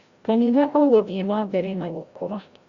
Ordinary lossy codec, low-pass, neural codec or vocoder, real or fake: Opus, 64 kbps; 7.2 kHz; codec, 16 kHz, 0.5 kbps, FreqCodec, larger model; fake